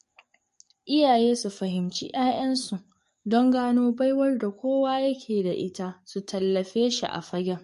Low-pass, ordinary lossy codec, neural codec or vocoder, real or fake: 14.4 kHz; MP3, 48 kbps; codec, 44.1 kHz, 7.8 kbps, DAC; fake